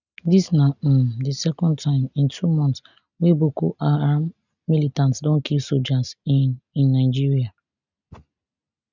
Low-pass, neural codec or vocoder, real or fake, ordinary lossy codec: 7.2 kHz; none; real; none